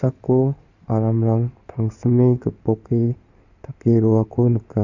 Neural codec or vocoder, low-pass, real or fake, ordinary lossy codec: codec, 16 kHz in and 24 kHz out, 2.2 kbps, FireRedTTS-2 codec; 7.2 kHz; fake; Opus, 64 kbps